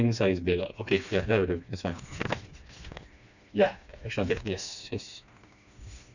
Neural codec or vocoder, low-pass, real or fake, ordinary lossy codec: codec, 16 kHz, 2 kbps, FreqCodec, smaller model; 7.2 kHz; fake; none